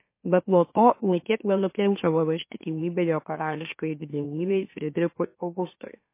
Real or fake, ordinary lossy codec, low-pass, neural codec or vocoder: fake; MP3, 24 kbps; 3.6 kHz; autoencoder, 44.1 kHz, a latent of 192 numbers a frame, MeloTTS